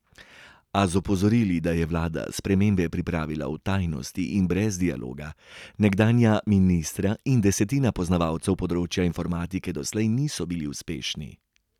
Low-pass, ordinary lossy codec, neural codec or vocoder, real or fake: 19.8 kHz; none; none; real